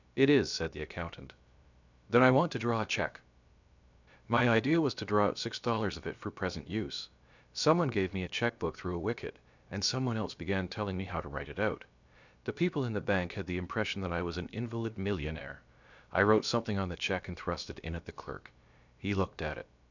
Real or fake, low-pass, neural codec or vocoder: fake; 7.2 kHz; codec, 16 kHz, about 1 kbps, DyCAST, with the encoder's durations